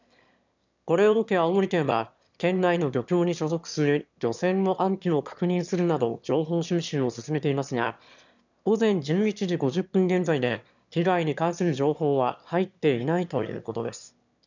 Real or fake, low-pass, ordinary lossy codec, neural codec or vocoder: fake; 7.2 kHz; none; autoencoder, 22.05 kHz, a latent of 192 numbers a frame, VITS, trained on one speaker